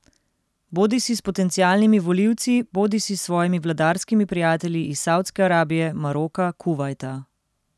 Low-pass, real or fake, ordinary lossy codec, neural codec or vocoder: none; real; none; none